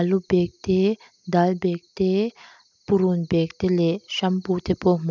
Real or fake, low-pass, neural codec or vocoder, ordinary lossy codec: real; 7.2 kHz; none; none